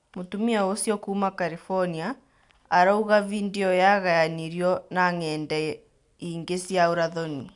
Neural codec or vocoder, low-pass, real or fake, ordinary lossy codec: none; 10.8 kHz; real; none